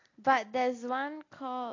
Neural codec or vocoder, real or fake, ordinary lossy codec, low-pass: none; real; AAC, 32 kbps; 7.2 kHz